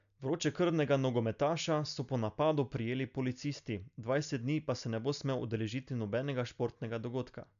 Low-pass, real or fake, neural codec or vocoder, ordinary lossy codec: 7.2 kHz; real; none; none